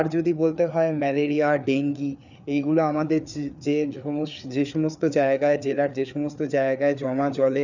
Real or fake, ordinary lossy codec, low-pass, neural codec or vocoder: fake; none; 7.2 kHz; codec, 16 kHz, 4 kbps, FreqCodec, larger model